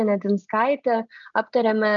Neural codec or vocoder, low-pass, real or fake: none; 7.2 kHz; real